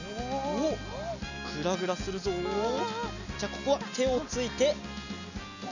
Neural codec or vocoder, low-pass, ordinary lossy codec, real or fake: none; 7.2 kHz; none; real